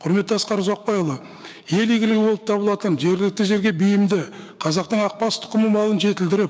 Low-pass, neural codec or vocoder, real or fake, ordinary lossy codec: none; codec, 16 kHz, 6 kbps, DAC; fake; none